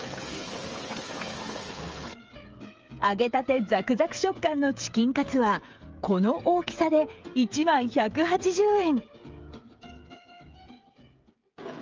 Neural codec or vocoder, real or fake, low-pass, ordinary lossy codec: codec, 16 kHz, 8 kbps, FreqCodec, smaller model; fake; 7.2 kHz; Opus, 24 kbps